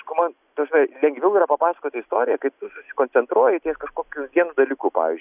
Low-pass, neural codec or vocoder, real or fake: 3.6 kHz; none; real